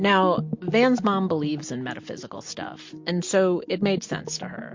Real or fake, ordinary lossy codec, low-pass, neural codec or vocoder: real; MP3, 48 kbps; 7.2 kHz; none